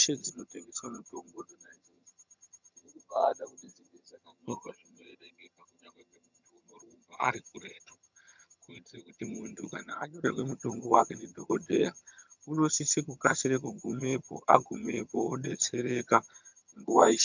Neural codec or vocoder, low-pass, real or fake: vocoder, 22.05 kHz, 80 mel bands, HiFi-GAN; 7.2 kHz; fake